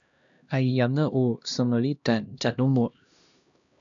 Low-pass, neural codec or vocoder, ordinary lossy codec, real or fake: 7.2 kHz; codec, 16 kHz, 1 kbps, X-Codec, HuBERT features, trained on LibriSpeech; MP3, 96 kbps; fake